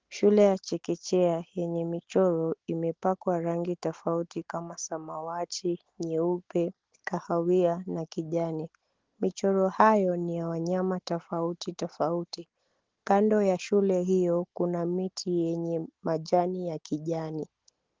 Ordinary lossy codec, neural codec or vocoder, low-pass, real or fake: Opus, 16 kbps; none; 7.2 kHz; real